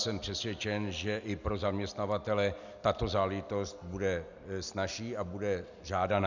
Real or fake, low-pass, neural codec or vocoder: real; 7.2 kHz; none